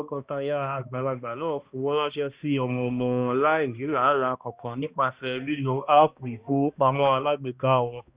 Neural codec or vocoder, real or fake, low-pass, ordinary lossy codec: codec, 16 kHz, 1 kbps, X-Codec, HuBERT features, trained on balanced general audio; fake; 3.6 kHz; Opus, 64 kbps